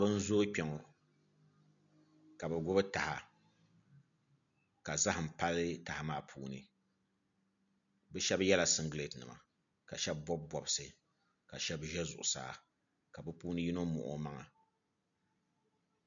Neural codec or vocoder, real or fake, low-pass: none; real; 7.2 kHz